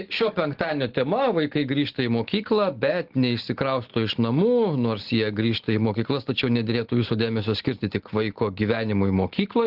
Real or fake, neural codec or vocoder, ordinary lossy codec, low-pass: real; none; Opus, 16 kbps; 5.4 kHz